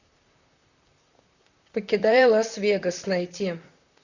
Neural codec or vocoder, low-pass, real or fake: vocoder, 44.1 kHz, 128 mel bands, Pupu-Vocoder; 7.2 kHz; fake